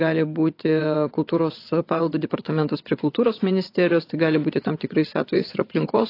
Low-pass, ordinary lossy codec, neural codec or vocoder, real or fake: 5.4 kHz; AAC, 32 kbps; vocoder, 22.05 kHz, 80 mel bands, WaveNeXt; fake